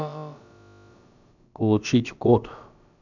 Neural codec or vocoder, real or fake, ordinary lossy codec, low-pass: codec, 16 kHz, about 1 kbps, DyCAST, with the encoder's durations; fake; none; 7.2 kHz